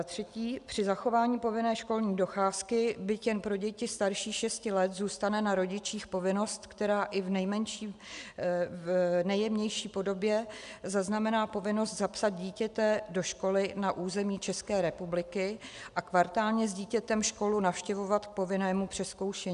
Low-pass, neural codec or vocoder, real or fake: 10.8 kHz; none; real